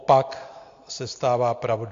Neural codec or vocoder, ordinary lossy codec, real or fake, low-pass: none; AAC, 48 kbps; real; 7.2 kHz